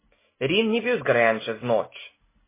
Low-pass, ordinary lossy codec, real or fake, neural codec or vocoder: 3.6 kHz; MP3, 16 kbps; real; none